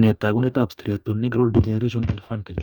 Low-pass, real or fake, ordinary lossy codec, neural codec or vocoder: 19.8 kHz; fake; none; codec, 44.1 kHz, 2.6 kbps, DAC